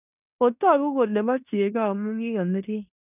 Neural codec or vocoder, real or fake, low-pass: codec, 16 kHz in and 24 kHz out, 0.9 kbps, LongCat-Audio-Codec, fine tuned four codebook decoder; fake; 3.6 kHz